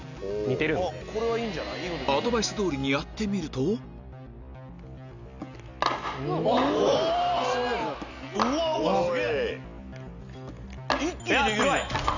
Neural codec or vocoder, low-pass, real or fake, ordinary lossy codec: none; 7.2 kHz; real; none